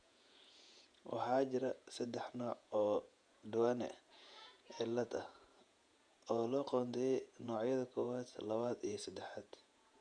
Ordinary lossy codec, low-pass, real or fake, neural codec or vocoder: none; 9.9 kHz; fake; vocoder, 48 kHz, 128 mel bands, Vocos